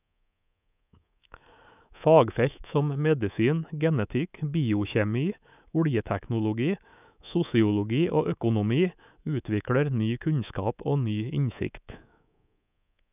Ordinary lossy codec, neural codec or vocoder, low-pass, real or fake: none; codec, 24 kHz, 3.1 kbps, DualCodec; 3.6 kHz; fake